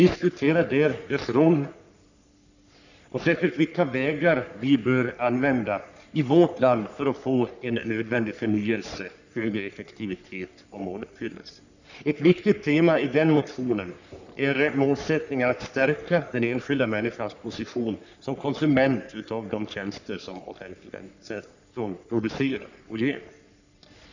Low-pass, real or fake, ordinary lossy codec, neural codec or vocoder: 7.2 kHz; fake; none; codec, 44.1 kHz, 3.4 kbps, Pupu-Codec